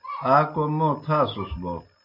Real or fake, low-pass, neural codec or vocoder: real; 5.4 kHz; none